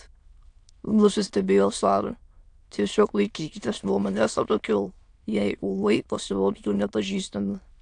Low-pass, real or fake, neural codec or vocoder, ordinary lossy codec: 9.9 kHz; fake; autoencoder, 22.05 kHz, a latent of 192 numbers a frame, VITS, trained on many speakers; AAC, 64 kbps